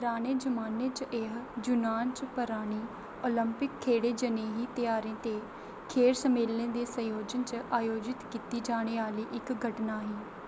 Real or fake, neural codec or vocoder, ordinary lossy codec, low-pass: real; none; none; none